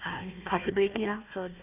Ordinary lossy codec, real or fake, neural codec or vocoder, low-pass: none; fake; codec, 16 kHz, 1 kbps, FunCodec, trained on Chinese and English, 50 frames a second; 3.6 kHz